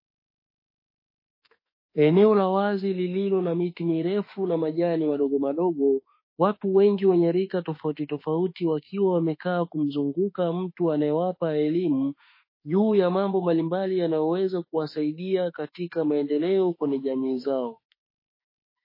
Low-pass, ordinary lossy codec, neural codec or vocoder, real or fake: 5.4 kHz; MP3, 24 kbps; autoencoder, 48 kHz, 32 numbers a frame, DAC-VAE, trained on Japanese speech; fake